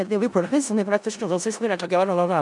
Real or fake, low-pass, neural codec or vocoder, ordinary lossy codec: fake; 10.8 kHz; codec, 16 kHz in and 24 kHz out, 0.4 kbps, LongCat-Audio-Codec, four codebook decoder; MP3, 96 kbps